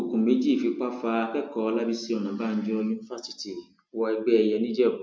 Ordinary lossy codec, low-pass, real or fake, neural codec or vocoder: none; none; real; none